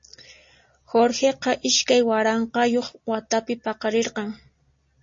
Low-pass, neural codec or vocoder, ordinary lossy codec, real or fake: 7.2 kHz; none; MP3, 32 kbps; real